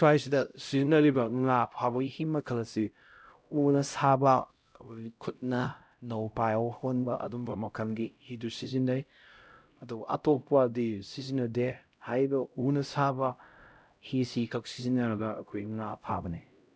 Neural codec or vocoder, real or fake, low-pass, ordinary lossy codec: codec, 16 kHz, 0.5 kbps, X-Codec, HuBERT features, trained on LibriSpeech; fake; none; none